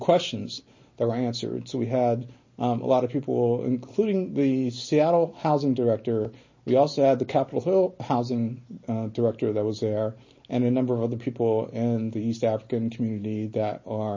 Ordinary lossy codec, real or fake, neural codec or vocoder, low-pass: MP3, 32 kbps; real; none; 7.2 kHz